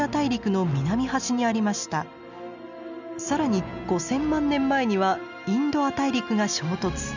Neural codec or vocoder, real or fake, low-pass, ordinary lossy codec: none; real; 7.2 kHz; none